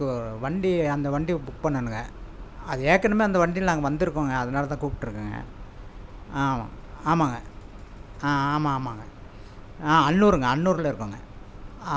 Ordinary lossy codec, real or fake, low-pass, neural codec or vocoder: none; real; none; none